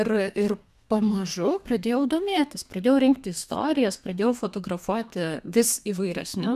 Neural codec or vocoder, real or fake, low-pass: codec, 32 kHz, 1.9 kbps, SNAC; fake; 14.4 kHz